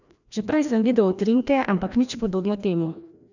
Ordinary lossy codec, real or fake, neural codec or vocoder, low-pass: none; fake; codec, 16 kHz, 1 kbps, FreqCodec, larger model; 7.2 kHz